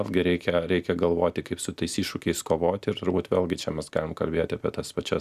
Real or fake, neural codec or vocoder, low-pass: real; none; 14.4 kHz